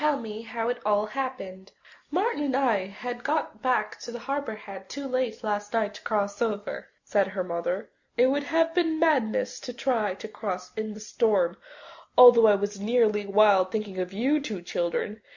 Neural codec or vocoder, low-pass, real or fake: none; 7.2 kHz; real